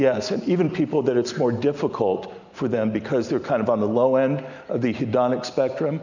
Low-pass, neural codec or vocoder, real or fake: 7.2 kHz; none; real